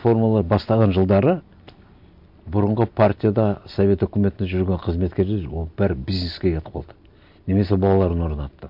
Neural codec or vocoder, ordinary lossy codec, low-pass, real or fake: none; MP3, 48 kbps; 5.4 kHz; real